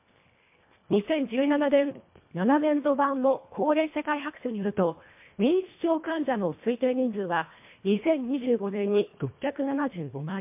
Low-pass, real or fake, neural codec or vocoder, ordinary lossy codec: 3.6 kHz; fake; codec, 24 kHz, 1.5 kbps, HILCodec; MP3, 32 kbps